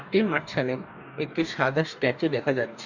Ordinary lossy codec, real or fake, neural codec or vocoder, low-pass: none; fake; codec, 44.1 kHz, 2.6 kbps, DAC; 7.2 kHz